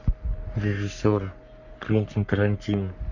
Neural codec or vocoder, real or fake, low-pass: codec, 44.1 kHz, 3.4 kbps, Pupu-Codec; fake; 7.2 kHz